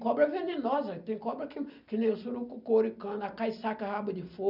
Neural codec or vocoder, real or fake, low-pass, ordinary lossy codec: none; real; 5.4 kHz; none